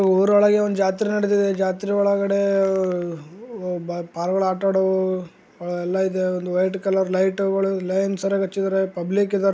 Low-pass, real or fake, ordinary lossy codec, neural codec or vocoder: none; real; none; none